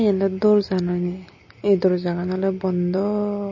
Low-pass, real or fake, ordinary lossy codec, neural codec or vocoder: 7.2 kHz; real; MP3, 32 kbps; none